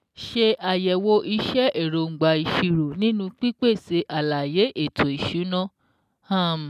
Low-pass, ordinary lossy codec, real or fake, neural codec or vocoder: 14.4 kHz; none; real; none